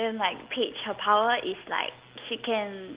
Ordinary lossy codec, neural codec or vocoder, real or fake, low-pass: Opus, 16 kbps; none; real; 3.6 kHz